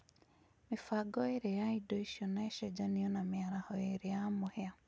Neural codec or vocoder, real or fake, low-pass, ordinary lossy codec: none; real; none; none